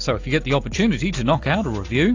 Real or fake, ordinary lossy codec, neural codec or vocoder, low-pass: real; AAC, 48 kbps; none; 7.2 kHz